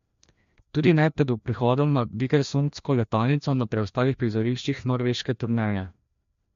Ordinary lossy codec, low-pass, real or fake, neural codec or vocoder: MP3, 48 kbps; 7.2 kHz; fake; codec, 16 kHz, 1 kbps, FreqCodec, larger model